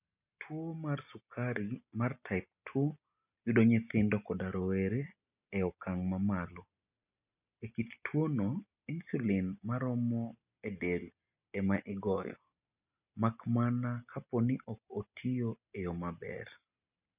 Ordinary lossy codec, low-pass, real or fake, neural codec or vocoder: none; 3.6 kHz; real; none